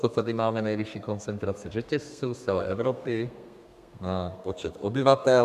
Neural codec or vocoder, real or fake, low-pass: codec, 32 kHz, 1.9 kbps, SNAC; fake; 14.4 kHz